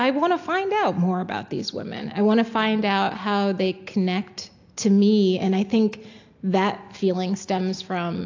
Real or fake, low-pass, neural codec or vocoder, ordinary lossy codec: real; 7.2 kHz; none; AAC, 48 kbps